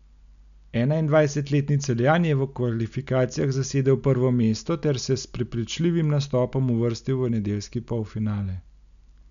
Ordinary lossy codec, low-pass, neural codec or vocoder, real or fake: none; 7.2 kHz; none; real